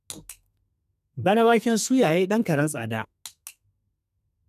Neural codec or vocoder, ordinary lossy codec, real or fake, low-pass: codec, 32 kHz, 1.9 kbps, SNAC; none; fake; 14.4 kHz